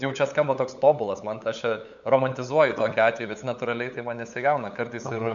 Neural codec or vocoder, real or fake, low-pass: codec, 16 kHz, 8 kbps, FunCodec, trained on LibriTTS, 25 frames a second; fake; 7.2 kHz